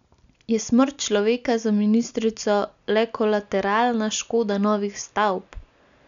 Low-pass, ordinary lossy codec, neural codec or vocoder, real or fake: 7.2 kHz; none; none; real